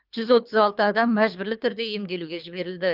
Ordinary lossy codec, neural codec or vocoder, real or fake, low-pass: Opus, 24 kbps; codec, 24 kHz, 3 kbps, HILCodec; fake; 5.4 kHz